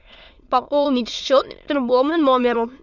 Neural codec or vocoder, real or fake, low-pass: autoencoder, 22.05 kHz, a latent of 192 numbers a frame, VITS, trained on many speakers; fake; 7.2 kHz